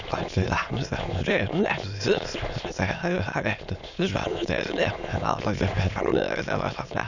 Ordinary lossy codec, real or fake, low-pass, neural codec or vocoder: none; fake; 7.2 kHz; autoencoder, 22.05 kHz, a latent of 192 numbers a frame, VITS, trained on many speakers